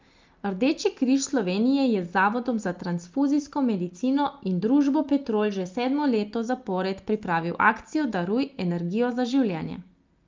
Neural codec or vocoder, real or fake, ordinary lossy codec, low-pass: none; real; Opus, 24 kbps; 7.2 kHz